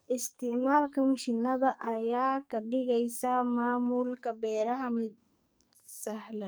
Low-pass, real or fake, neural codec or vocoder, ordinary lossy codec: none; fake; codec, 44.1 kHz, 2.6 kbps, SNAC; none